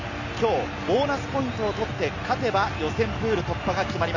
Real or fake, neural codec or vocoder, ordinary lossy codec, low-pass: real; none; none; 7.2 kHz